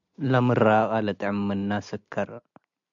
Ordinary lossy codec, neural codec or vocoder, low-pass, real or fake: MP3, 64 kbps; none; 7.2 kHz; real